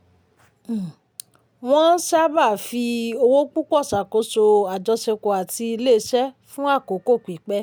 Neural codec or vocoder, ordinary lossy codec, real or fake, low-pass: none; none; real; none